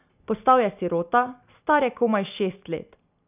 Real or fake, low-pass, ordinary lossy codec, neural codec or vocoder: real; 3.6 kHz; none; none